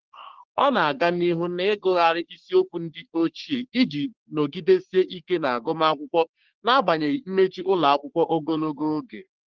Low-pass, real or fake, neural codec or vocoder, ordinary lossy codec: 7.2 kHz; fake; codec, 44.1 kHz, 3.4 kbps, Pupu-Codec; Opus, 16 kbps